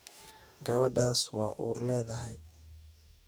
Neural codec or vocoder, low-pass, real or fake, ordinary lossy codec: codec, 44.1 kHz, 2.6 kbps, DAC; none; fake; none